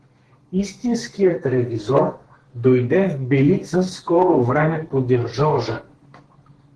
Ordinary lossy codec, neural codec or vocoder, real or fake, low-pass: Opus, 16 kbps; codec, 32 kHz, 1.9 kbps, SNAC; fake; 10.8 kHz